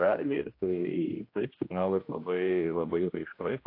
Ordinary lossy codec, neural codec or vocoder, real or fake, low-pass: AAC, 32 kbps; codec, 16 kHz, 1 kbps, X-Codec, HuBERT features, trained on general audio; fake; 5.4 kHz